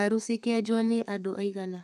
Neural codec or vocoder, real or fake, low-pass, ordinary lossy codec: codec, 32 kHz, 1.9 kbps, SNAC; fake; 14.4 kHz; none